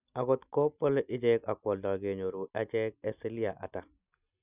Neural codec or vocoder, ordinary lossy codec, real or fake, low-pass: none; none; real; 3.6 kHz